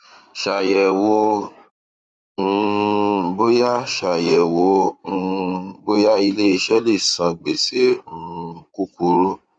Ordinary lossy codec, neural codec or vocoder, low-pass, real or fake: none; codec, 16 kHz in and 24 kHz out, 2.2 kbps, FireRedTTS-2 codec; 9.9 kHz; fake